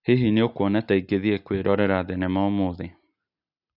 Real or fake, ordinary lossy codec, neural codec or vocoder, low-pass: real; none; none; 5.4 kHz